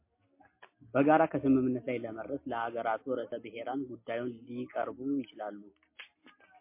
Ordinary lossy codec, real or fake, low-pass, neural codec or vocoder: MP3, 24 kbps; real; 3.6 kHz; none